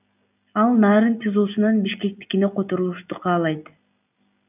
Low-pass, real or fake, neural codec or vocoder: 3.6 kHz; real; none